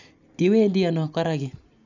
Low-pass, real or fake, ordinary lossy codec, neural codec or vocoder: 7.2 kHz; real; none; none